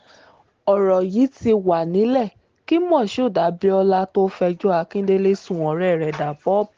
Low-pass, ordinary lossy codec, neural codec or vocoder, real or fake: 7.2 kHz; Opus, 16 kbps; none; real